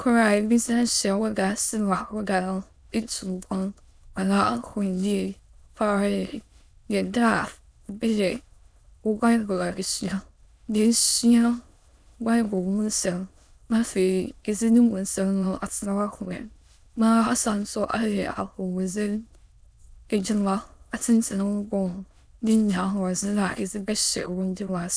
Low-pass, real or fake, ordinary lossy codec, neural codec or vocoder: none; fake; none; autoencoder, 22.05 kHz, a latent of 192 numbers a frame, VITS, trained on many speakers